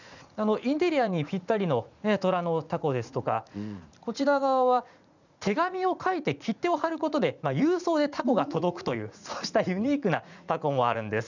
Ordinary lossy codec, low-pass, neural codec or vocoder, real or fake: none; 7.2 kHz; vocoder, 44.1 kHz, 80 mel bands, Vocos; fake